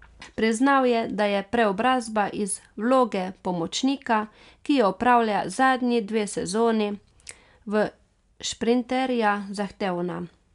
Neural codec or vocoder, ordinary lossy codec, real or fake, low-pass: none; none; real; 10.8 kHz